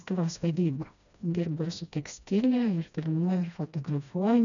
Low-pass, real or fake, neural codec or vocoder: 7.2 kHz; fake; codec, 16 kHz, 1 kbps, FreqCodec, smaller model